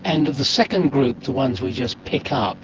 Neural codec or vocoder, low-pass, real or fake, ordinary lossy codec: vocoder, 24 kHz, 100 mel bands, Vocos; 7.2 kHz; fake; Opus, 16 kbps